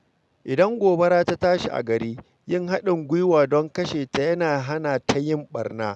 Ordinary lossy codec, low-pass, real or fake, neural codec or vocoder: none; none; real; none